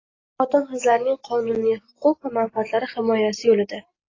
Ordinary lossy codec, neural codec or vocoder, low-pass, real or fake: AAC, 32 kbps; none; 7.2 kHz; real